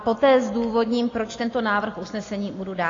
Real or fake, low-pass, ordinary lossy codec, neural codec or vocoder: real; 7.2 kHz; AAC, 32 kbps; none